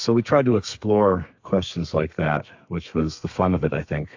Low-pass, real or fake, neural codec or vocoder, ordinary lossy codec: 7.2 kHz; fake; codec, 32 kHz, 1.9 kbps, SNAC; AAC, 48 kbps